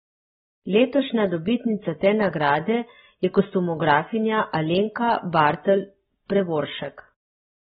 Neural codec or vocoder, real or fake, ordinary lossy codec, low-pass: none; real; AAC, 16 kbps; 7.2 kHz